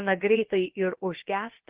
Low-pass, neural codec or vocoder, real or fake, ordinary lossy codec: 3.6 kHz; codec, 16 kHz, about 1 kbps, DyCAST, with the encoder's durations; fake; Opus, 16 kbps